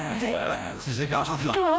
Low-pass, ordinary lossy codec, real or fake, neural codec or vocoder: none; none; fake; codec, 16 kHz, 0.5 kbps, FreqCodec, larger model